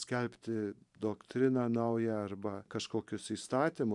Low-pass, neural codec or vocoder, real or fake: 10.8 kHz; vocoder, 44.1 kHz, 128 mel bands every 512 samples, BigVGAN v2; fake